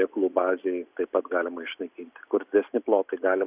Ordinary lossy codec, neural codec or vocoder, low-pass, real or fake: Opus, 64 kbps; none; 3.6 kHz; real